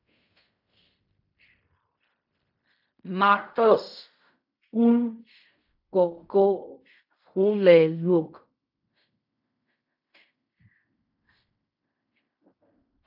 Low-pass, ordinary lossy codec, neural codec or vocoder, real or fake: 5.4 kHz; AAC, 48 kbps; codec, 16 kHz in and 24 kHz out, 0.4 kbps, LongCat-Audio-Codec, fine tuned four codebook decoder; fake